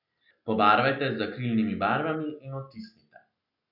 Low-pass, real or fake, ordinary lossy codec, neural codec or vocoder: 5.4 kHz; real; none; none